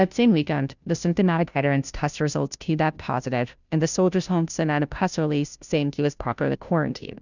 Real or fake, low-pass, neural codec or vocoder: fake; 7.2 kHz; codec, 16 kHz, 0.5 kbps, FunCodec, trained on Chinese and English, 25 frames a second